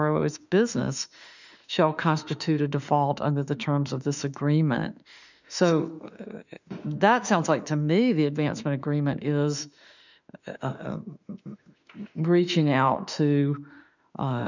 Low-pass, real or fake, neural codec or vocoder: 7.2 kHz; fake; autoencoder, 48 kHz, 32 numbers a frame, DAC-VAE, trained on Japanese speech